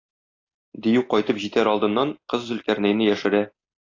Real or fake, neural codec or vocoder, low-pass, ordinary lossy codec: real; none; 7.2 kHz; AAC, 32 kbps